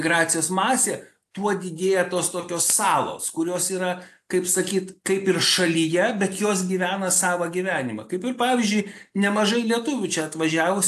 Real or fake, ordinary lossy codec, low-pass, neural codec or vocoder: real; AAC, 64 kbps; 14.4 kHz; none